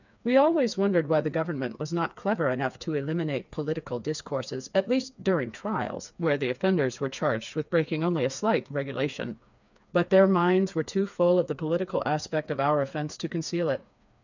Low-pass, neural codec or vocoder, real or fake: 7.2 kHz; codec, 16 kHz, 4 kbps, FreqCodec, smaller model; fake